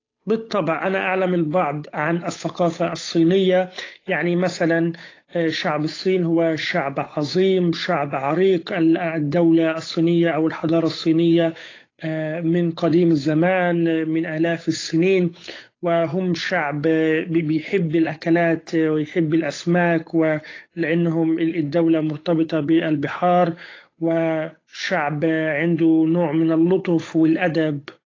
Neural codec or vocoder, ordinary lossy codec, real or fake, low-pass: codec, 16 kHz, 8 kbps, FunCodec, trained on Chinese and English, 25 frames a second; AAC, 32 kbps; fake; 7.2 kHz